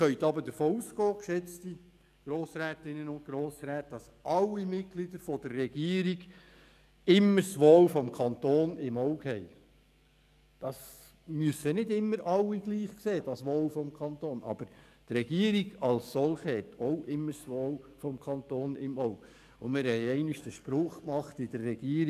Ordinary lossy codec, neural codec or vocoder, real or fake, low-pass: none; codec, 44.1 kHz, 7.8 kbps, DAC; fake; 14.4 kHz